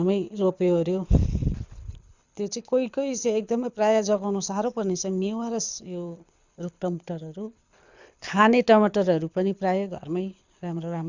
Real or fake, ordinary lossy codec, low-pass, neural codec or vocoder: fake; Opus, 64 kbps; 7.2 kHz; codec, 24 kHz, 6 kbps, HILCodec